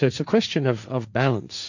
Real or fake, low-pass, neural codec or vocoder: fake; 7.2 kHz; codec, 16 kHz, 1.1 kbps, Voila-Tokenizer